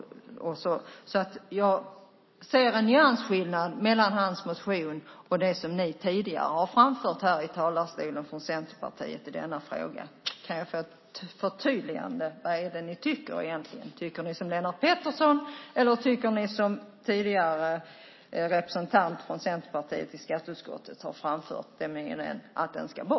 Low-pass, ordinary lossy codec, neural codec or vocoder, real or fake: 7.2 kHz; MP3, 24 kbps; none; real